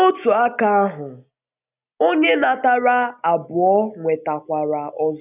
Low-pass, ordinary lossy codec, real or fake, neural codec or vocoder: 3.6 kHz; none; real; none